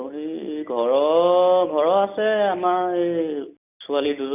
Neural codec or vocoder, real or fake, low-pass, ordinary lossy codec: none; real; 3.6 kHz; none